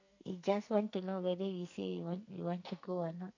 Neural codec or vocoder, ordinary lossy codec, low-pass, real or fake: codec, 44.1 kHz, 2.6 kbps, SNAC; AAC, 48 kbps; 7.2 kHz; fake